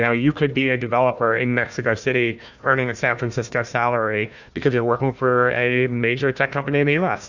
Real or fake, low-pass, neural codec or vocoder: fake; 7.2 kHz; codec, 16 kHz, 1 kbps, FunCodec, trained on Chinese and English, 50 frames a second